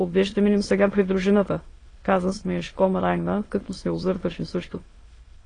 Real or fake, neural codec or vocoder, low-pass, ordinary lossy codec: fake; autoencoder, 22.05 kHz, a latent of 192 numbers a frame, VITS, trained on many speakers; 9.9 kHz; AAC, 32 kbps